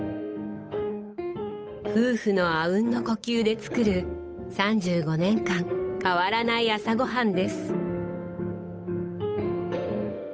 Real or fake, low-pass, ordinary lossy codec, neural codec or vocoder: fake; none; none; codec, 16 kHz, 8 kbps, FunCodec, trained on Chinese and English, 25 frames a second